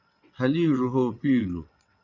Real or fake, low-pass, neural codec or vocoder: fake; 7.2 kHz; vocoder, 22.05 kHz, 80 mel bands, WaveNeXt